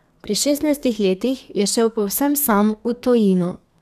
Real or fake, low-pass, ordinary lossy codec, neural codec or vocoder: fake; 14.4 kHz; none; codec, 32 kHz, 1.9 kbps, SNAC